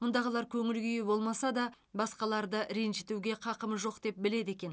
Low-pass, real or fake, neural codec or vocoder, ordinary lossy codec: none; real; none; none